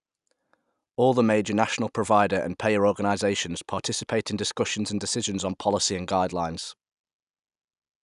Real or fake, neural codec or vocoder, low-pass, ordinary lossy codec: real; none; 10.8 kHz; none